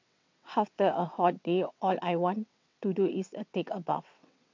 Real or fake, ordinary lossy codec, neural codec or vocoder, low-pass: fake; MP3, 48 kbps; vocoder, 22.05 kHz, 80 mel bands, WaveNeXt; 7.2 kHz